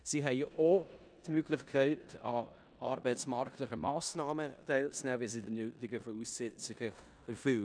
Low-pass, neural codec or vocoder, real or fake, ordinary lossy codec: 9.9 kHz; codec, 16 kHz in and 24 kHz out, 0.9 kbps, LongCat-Audio-Codec, four codebook decoder; fake; none